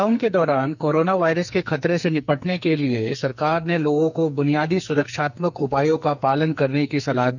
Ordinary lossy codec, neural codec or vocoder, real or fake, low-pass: none; codec, 44.1 kHz, 2.6 kbps, SNAC; fake; 7.2 kHz